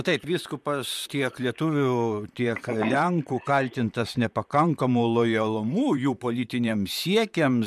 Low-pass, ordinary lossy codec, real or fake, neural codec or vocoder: 14.4 kHz; MP3, 96 kbps; fake; vocoder, 44.1 kHz, 128 mel bands, Pupu-Vocoder